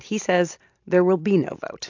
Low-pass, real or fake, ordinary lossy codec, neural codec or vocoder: 7.2 kHz; real; AAC, 48 kbps; none